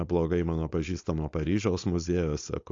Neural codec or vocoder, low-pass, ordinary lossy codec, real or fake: codec, 16 kHz, 4.8 kbps, FACodec; 7.2 kHz; AAC, 48 kbps; fake